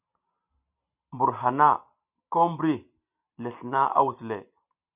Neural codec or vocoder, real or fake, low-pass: none; real; 3.6 kHz